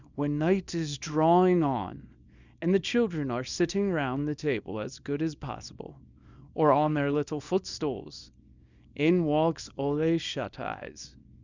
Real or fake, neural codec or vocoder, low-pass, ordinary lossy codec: fake; codec, 24 kHz, 0.9 kbps, WavTokenizer, small release; 7.2 kHz; Opus, 64 kbps